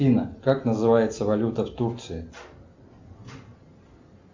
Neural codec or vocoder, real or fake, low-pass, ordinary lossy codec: none; real; 7.2 kHz; MP3, 64 kbps